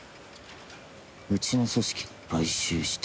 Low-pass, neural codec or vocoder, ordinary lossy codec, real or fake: none; none; none; real